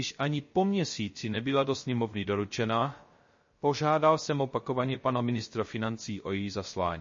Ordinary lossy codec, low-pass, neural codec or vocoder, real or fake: MP3, 32 kbps; 7.2 kHz; codec, 16 kHz, 0.3 kbps, FocalCodec; fake